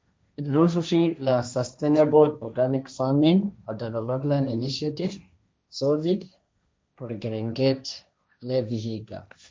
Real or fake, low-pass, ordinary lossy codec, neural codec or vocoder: fake; none; none; codec, 16 kHz, 1.1 kbps, Voila-Tokenizer